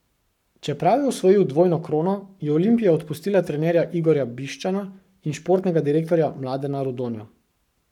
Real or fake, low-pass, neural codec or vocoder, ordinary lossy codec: fake; 19.8 kHz; codec, 44.1 kHz, 7.8 kbps, Pupu-Codec; none